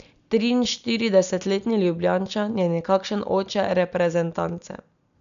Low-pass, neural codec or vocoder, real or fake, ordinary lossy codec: 7.2 kHz; none; real; none